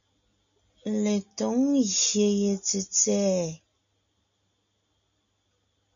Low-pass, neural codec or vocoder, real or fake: 7.2 kHz; none; real